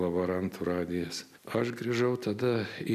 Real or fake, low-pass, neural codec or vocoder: real; 14.4 kHz; none